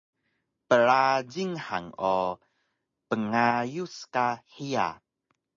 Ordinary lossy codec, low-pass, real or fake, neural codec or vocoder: MP3, 32 kbps; 7.2 kHz; real; none